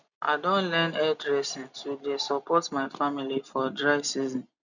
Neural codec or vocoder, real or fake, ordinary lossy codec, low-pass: none; real; none; 7.2 kHz